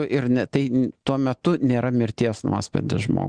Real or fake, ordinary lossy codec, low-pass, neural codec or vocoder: real; Opus, 64 kbps; 9.9 kHz; none